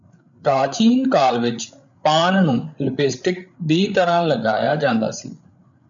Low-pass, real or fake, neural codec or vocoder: 7.2 kHz; fake; codec, 16 kHz, 8 kbps, FreqCodec, larger model